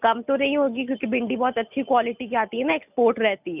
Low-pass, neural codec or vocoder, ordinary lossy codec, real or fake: 3.6 kHz; none; none; real